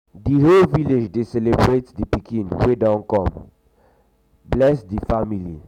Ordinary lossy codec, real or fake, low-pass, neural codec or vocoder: none; real; 19.8 kHz; none